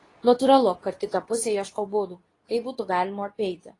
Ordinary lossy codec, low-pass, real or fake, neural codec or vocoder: AAC, 32 kbps; 10.8 kHz; fake; codec, 24 kHz, 0.9 kbps, WavTokenizer, medium speech release version 2